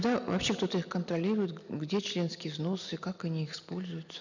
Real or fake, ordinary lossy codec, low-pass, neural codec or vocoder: real; none; 7.2 kHz; none